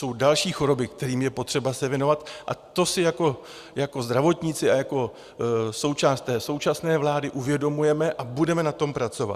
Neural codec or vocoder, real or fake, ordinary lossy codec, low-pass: none; real; Opus, 64 kbps; 14.4 kHz